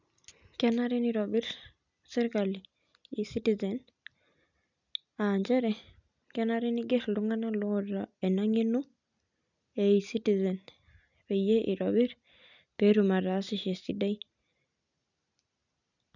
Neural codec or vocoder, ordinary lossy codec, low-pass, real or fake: none; none; 7.2 kHz; real